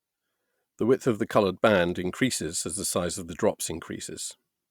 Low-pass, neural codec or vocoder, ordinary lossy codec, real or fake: 19.8 kHz; vocoder, 44.1 kHz, 128 mel bands every 256 samples, BigVGAN v2; Opus, 64 kbps; fake